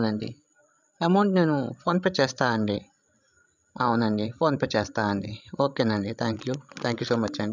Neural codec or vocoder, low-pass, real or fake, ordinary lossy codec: codec, 16 kHz, 16 kbps, FreqCodec, larger model; 7.2 kHz; fake; none